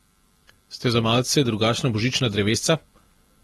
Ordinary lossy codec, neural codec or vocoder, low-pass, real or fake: AAC, 32 kbps; none; 19.8 kHz; real